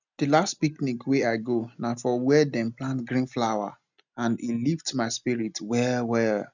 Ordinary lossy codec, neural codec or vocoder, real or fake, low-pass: none; none; real; 7.2 kHz